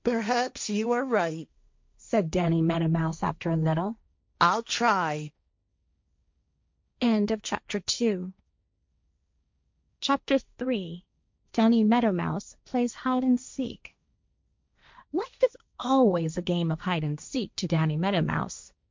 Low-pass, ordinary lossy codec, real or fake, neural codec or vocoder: 7.2 kHz; MP3, 64 kbps; fake; codec, 16 kHz, 1.1 kbps, Voila-Tokenizer